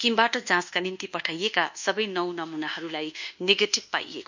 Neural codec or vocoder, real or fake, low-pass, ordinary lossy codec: codec, 24 kHz, 1.2 kbps, DualCodec; fake; 7.2 kHz; none